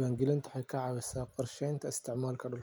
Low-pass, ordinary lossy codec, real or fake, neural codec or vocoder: none; none; real; none